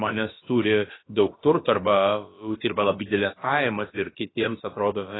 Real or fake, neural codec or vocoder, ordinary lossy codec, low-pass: fake; codec, 16 kHz, about 1 kbps, DyCAST, with the encoder's durations; AAC, 16 kbps; 7.2 kHz